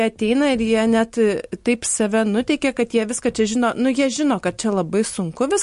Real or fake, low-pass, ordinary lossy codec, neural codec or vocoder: real; 14.4 kHz; MP3, 48 kbps; none